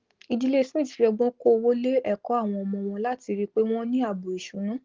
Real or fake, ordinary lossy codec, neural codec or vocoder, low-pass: real; Opus, 16 kbps; none; 7.2 kHz